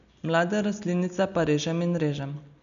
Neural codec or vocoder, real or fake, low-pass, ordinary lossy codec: none; real; 7.2 kHz; none